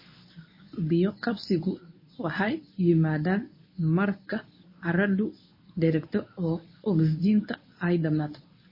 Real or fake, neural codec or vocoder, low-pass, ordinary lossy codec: fake; codec, 24 kHz, 0.9 kbps, WavTokenizer, medium speech release version 1; 5.4 kHz; MP3, 24 kbps